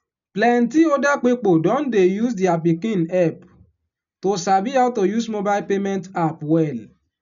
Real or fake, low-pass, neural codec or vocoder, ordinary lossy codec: real; 7.2 kHz; none; none